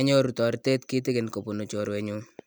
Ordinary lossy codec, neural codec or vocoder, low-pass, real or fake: none; none; none; real